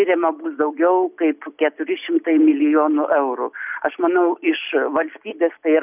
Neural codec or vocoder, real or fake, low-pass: none; real; 3.6 kHz